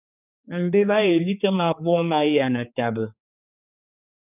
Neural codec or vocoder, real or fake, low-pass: codec, 16 kHz, 4 kbps, X-Codec, HuBERT features, trained on general audio; fake; 3.6 kHz